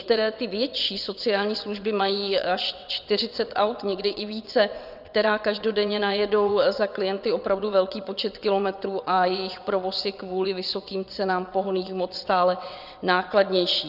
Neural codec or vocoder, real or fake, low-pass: vocoder, 22.05 kHz, 80 mel bands, WaveNeXt; fake; 5.4 kHz